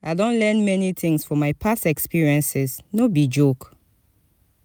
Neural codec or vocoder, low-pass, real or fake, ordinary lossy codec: none; none; real; none